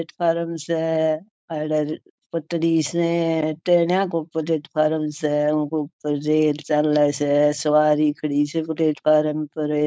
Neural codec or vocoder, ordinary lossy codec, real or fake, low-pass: codec, 16 kHz, 4.8 kbps, FACodec; none; fake; none